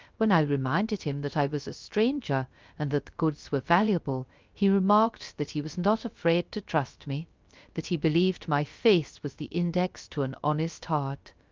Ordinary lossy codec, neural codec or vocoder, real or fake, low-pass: Opus, 24 kbps; codec, 16 kHz, 0.3 kbps, FocalCodec; fake; 7.2 kHz